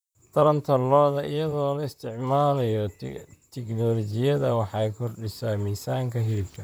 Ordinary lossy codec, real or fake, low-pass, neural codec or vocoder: none; fake; none; vocoder, 44.1 kHz, 128 mel bands, Pupu-Vocoder